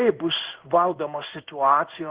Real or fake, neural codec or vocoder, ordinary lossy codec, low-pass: fake; codec, 16 kHz in and 24 kHz out, 1 kbps, XY-Tokenizer; Opus, 16 kbps; 3.6 kHz